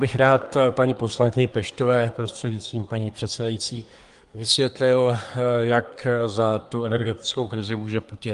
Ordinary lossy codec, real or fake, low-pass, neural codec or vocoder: Opus, 32 kbps; fake; 10.8 kHz; codec, 24 kHz, 1 kbps, SNAC